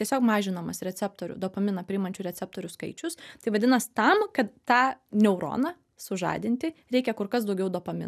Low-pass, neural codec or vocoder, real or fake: 14.4 kHz; none; real